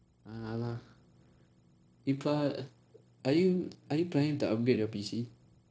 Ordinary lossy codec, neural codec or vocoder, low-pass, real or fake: none; codec, 16 kHz, 0.9 kbps, LongCat-Audio-Codec; none; fake